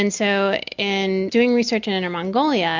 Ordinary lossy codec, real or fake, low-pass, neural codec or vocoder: MP3, 64 kbps; real; 7.2 kHz; none